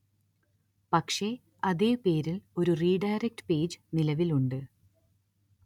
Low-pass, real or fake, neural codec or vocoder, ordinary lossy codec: 19.8 kHz; real; none; none